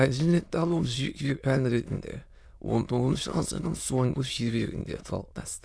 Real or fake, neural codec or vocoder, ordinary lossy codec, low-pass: fake; autoencoder, 22.05 kHz, a latent of 192 numbers a frame, VITS, trained on many speakers; none; none